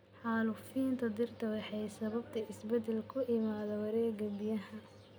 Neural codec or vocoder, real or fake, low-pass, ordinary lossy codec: none; real; none; none